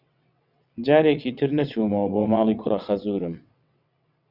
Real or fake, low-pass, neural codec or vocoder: fake; 5.4 kHz; vocoder, 22.05 kHz, 80 mel bands, WaveNeXt